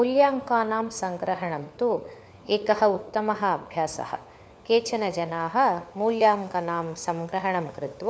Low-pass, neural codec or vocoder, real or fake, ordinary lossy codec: none; codec, 16 kHz, 4 kbps, FunCodec, trained on LibriTTS, 50 frames a second; fake; none